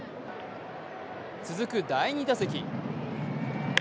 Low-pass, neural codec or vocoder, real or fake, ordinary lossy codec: none; none; real; none